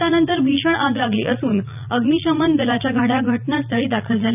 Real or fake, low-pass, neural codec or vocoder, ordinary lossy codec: fake; 3.6 kHz; vocoder, 44.1 kHz, 80 mel bands, Vocos; none